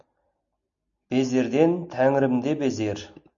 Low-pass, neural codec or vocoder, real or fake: 7.2 kHz; none; real